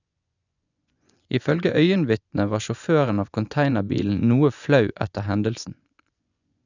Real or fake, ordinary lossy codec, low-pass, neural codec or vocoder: fake; none; 7.2 kHz; vocoder, 24 kHz, 100 mel bands, Vocos